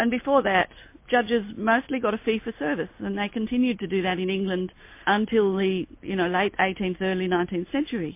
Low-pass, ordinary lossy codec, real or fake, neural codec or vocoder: 3.6 kHz; MP3, 24 kbps; real; none